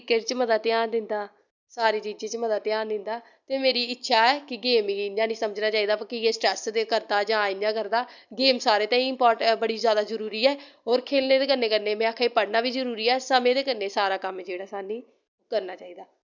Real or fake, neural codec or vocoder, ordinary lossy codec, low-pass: real; none; none; 7.2 kHz